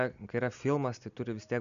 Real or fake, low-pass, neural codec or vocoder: real; 7.2 kHz; none